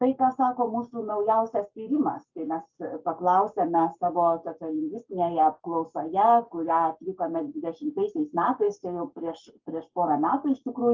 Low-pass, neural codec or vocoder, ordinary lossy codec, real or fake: 7.2 kHz; codec, 44.1 kHz, 7.8 kbps, Pupu-Codec; Opus, 24 kbps; fake